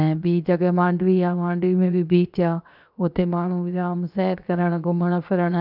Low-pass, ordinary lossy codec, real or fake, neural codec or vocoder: 5.4 kHz; none; fake; codec, 16 kHz, 0.7 kbps, FocalCodec